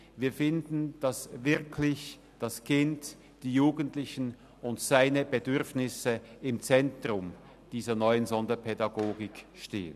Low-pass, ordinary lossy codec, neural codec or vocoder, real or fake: 14.4 kHz; none; none; real